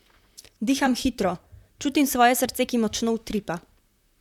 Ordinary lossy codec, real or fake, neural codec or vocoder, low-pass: none; fake; vocoder, 44.1 kHz, 128 mel bands, Pupu-Vocoder; 19.8 kHz